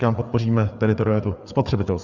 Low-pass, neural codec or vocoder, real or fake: 7.2 kHz; codec, 16 kHz, 4 kbps, FreqCodec, larger model; fake